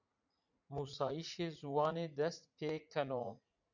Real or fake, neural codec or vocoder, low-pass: fake; vocoder, 44.1 kHz, 80 mel bands, Vocos; 7.2 kHz